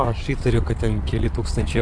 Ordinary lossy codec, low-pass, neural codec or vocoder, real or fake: AAC, 64 kbps; 9.9 kHz; vocoder, 22.05 kHz, 80 mel bands, WaveNeXt; fake